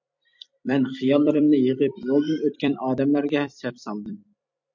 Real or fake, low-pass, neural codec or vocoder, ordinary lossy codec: fake; 7.2 kHz; vocoder, 44.1 kHz, 128 mel bands every 256 samples, BigVGAN v2; MP3, 48 kbps